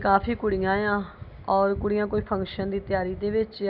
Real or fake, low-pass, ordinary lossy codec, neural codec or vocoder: real; 5.4 kHz; none; none